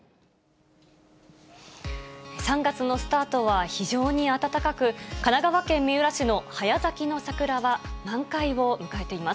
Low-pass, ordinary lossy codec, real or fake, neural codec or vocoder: none; none; real; none